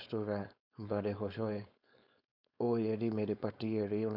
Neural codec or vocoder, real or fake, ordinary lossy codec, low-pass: codec, 16 kHz, 4.8 kbps, FACodec; fake; none; 5.4 kHz